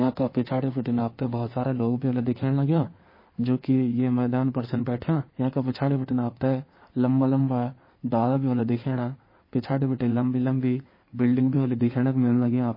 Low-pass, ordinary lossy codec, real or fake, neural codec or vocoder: 5.4 kHz; MP3, 24 kbps; fake; codec, 16 kHz in and 24 kHz out, 2.2 kbps, FireRedTTS-2 codec